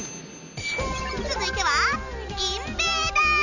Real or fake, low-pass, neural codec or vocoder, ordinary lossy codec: real; 7.2 kHz; none; none